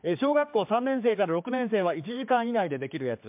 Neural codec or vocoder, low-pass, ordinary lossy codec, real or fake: codec, 16 kHz, 4 kbps, X-Codec, HuBERT features, trained on general audio; 3.6 kHz; MP3, 32 kbps; fake